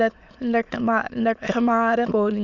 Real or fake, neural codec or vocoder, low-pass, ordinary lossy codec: fake; autoencoder, 22.05 kHz, a latent of 192 numbers a frame, VITS, trained on many speakers; 7.2 kHz; none